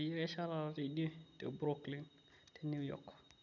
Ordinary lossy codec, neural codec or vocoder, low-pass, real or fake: none; none; 7.2 kHz; real